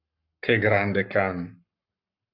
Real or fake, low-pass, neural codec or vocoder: fake; 5.4 kHz; codec, 44.1 kHz, 7.8 kbps, Pupu-Codec